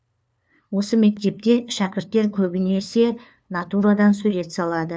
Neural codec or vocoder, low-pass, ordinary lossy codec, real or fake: codec, 16 kHz, 2 kbps, FunCodec, trained on LibriTTS, 25 frames a second; none; none; fake